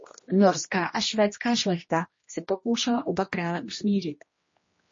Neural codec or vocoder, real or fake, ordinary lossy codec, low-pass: codec, 16 kHz, 1 kbps, X-Codec, HuBERT features, trained on general audio; fake; MP3, 32 kbps; 7.2 kHz